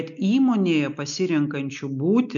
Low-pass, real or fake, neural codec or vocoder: 7.2 kHz; real; none